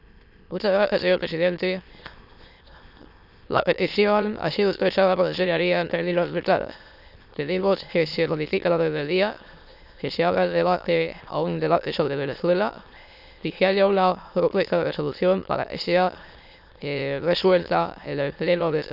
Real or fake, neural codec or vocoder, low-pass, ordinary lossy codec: fake; autoencoder, 22.05 kHz, a latent of 192 numbers a frame, VITS, trained on many speakers; 5.4 kHz; none